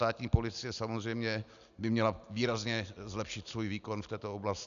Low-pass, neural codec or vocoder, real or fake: 7.2 kHz; none; real